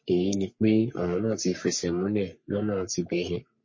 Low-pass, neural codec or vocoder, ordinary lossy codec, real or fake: 7.2 kHz; codec, 44.1 kHz, 3.4 kbps, Pupu-Codec; MP3, 32 kbps; fake